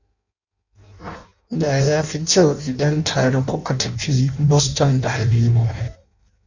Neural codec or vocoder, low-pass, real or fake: codec, 16 kHz in and 24 kHz out, 0.6 kbps, FireRedTTS-2 codec; 7.2 kHz; fake